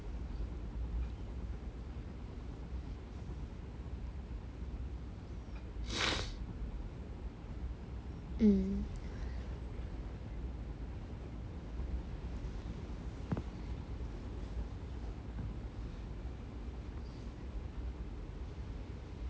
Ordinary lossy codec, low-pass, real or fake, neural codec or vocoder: none; none; real; none